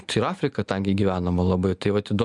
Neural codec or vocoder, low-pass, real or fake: none; 10.8 kHz; real